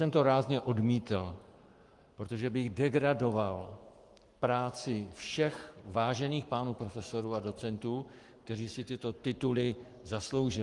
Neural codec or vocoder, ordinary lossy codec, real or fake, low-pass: codec, 44.1 kHz, 7.8 kbps, Pupu-Codec; Opus, 32 kbps; fake; 10.8 kHz